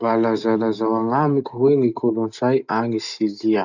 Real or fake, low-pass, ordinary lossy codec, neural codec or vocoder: real; 7.2 kHz; none; none